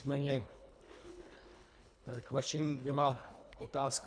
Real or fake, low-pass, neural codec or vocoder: fake; 9.9 kHz; codec, 24 kHz, 1.5 kbps, HILCodec